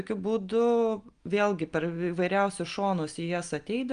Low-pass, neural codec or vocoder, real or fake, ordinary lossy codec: 9.9 kHz; none; real; Opus, 32 kbps